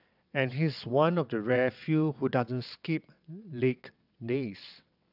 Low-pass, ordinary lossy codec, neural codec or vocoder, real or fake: 5.4 kHz; none; vocoder, 22.05 kHz, 80 mel bands, WaveNeXt; fake